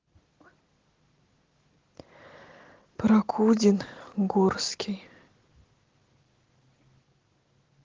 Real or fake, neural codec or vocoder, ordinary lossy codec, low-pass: real; none; Opus, 16 kbps; 7.2 kHz